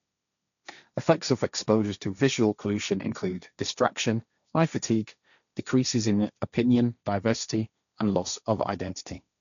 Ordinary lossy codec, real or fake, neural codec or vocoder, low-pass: none; fake; codec, 16 kHz, 1.1 kbps, Voila-Tokenizer; 7.2 kHz